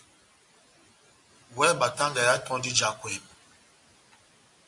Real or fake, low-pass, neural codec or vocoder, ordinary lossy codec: real; 10.8 kHz; none; AAC, 64 kbps